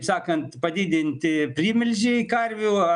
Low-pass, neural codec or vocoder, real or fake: 9.9 kHz; none; real